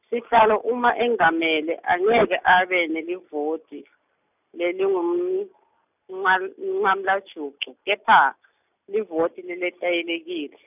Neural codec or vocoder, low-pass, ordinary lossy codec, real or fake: none; 3.6 kHz; none; real